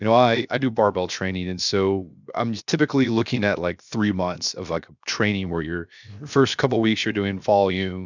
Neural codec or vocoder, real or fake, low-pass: codec, 16 kHz, 0.7 kbps, FocalCodec; fake; 7.2 kHz